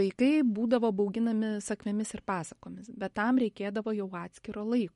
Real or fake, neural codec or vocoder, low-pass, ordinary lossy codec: real; none; 19.8 kHz; MP3, 48 kbps